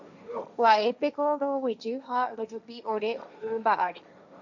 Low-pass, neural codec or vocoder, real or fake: 7.2 kHz; codec, 16 kHz, 1.1 kbps, Voila-Tokenizer; fake